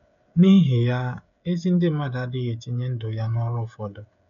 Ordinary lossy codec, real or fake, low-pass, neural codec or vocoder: none; fake; 7.2 kHz; codec, 16 kHz, 16 kbps, FreqCodec, smaller model